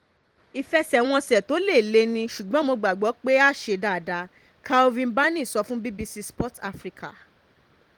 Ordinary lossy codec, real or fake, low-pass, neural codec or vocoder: Opus, 24 kbps; fake; 19.8 kHz; vocoder, 44.1 kHz, 128 mel bands every 256 samples, BigVGAN v2